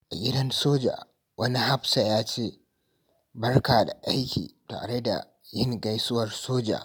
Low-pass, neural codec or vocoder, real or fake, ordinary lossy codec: none; none; real; none